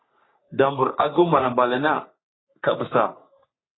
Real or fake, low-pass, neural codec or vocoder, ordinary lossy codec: fake; 7.2 kHz; codec, 44.1 kHz, 3.4 kbps, Pupu-Codec; AAC, 16 kbps